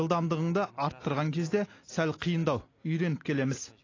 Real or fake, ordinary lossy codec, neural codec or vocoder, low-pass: real; AAC, 32 kbps; none; 7.2 kHz